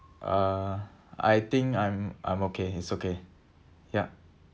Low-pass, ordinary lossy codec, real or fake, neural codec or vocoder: none; none; real; none